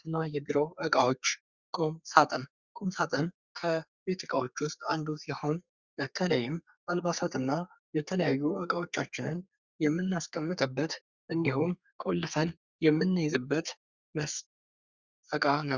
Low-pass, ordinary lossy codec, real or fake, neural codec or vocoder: 7.2 kHz; Opus, 64 kbps; fake; codec, 32 kHz, 1.9 kbps, SNAC